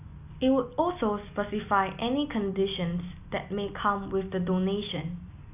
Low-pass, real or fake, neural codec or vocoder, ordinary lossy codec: 3.6 kHz; real; none; none